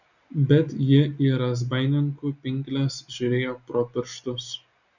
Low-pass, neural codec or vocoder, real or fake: 7.2 kHz; none; real